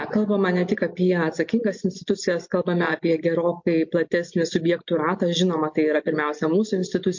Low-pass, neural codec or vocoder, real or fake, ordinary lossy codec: 7.2 kHz; none; real; MP3, 48 kbps